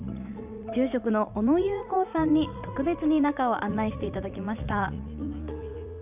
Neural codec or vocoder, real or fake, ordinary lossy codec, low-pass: vocoder, 44.1 kHz, 80 mel bands, Vocos; fake; none; 3.6 kHz